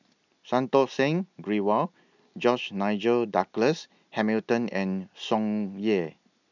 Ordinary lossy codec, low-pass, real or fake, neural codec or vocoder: none; 7.2 kHz; real; none